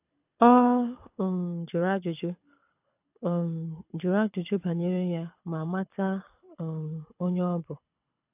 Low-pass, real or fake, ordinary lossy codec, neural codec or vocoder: 3.6 kHz; fake; AAC, 32 kbps; codec, 24 kHz, 6 kbps, HILCodec